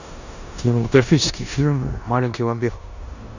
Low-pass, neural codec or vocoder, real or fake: 7.2 kHz; codec, 16 kHz in and 24 kHz out, 0.9 kbps, LongCat-Audio-Codec, four codebook decoder; fake